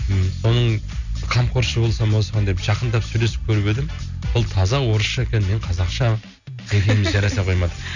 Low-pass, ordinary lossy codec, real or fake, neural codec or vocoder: 7.2 kHz; none; real; none